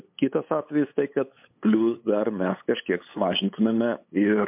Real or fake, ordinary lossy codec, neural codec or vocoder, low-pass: fake; MP3, 32 kbps; codec, 16 kHz, 8 kbps, FunCodec, trained on LibriTTS, 25 frames a second; 3.6 kHz